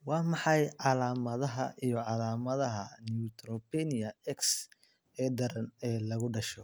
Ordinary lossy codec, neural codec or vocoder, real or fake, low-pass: none; none; real; none